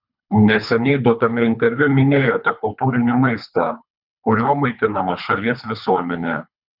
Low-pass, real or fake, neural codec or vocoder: 5.4 kHz; fake; codec, 24 kHz, 3 kbps, HILCodec